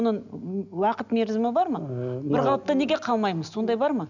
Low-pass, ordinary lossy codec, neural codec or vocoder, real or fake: 7.2 kHz; none; none; real